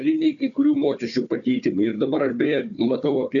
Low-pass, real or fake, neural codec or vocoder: 7.2 kHz; fake; codec, 16 kHz, 4 kbps, FunCodec, trained on Chinese and English, 50 frames a second